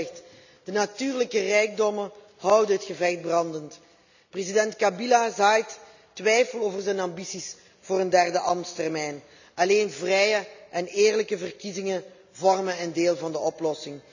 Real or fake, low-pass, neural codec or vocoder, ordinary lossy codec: real; 7.2 kHz; none; none